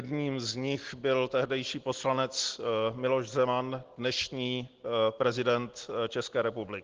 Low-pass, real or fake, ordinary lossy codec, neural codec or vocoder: 7.2 kHz; real; Opus, 16 kbps; none